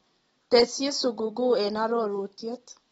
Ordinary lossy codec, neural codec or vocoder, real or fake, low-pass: AAC, 24 kbps; none; real; 10.8 kHz